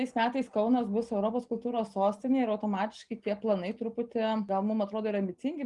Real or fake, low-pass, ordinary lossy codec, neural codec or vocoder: real; 10.8 kHz; Opus, 32 kbps; none